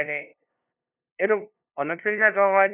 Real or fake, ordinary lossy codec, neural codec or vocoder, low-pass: fake; none; codec, 16 kHz, 0.5 kbps, FunCodec, trained on LibriTTS, 25 frames a second; 3.6 kHz